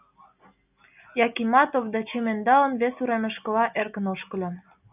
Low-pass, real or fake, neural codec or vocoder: 3.6 kHz; real; none